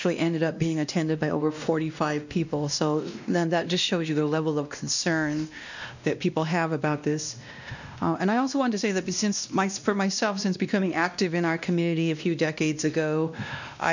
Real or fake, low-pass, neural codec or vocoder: fake; 7.2 kHz; codec, 16 kHz, 1 kbps, X-Codec, WavLM features, trained on Multilingual LibriSpeech